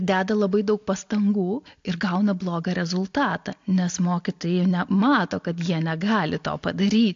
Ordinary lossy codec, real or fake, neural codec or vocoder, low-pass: AAC, 48 kbps; real; none; 7.2 kHz